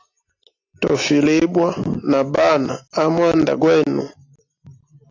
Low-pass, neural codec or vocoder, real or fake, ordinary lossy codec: 7.2 kHz; none; real; AAC, 48 kbps